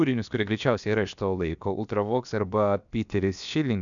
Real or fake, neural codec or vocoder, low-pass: fake; codec, 16 kHz, about 1 kbps, DyCAST, with the encoder's durations; 7.2 kHz